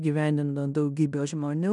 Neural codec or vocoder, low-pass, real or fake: codec, 16 kHz in and 24 kHz out, 0.9 kbps, LongCat-Audio-Codec, fine tuned four codebook decoder; 10.8 kHz; fake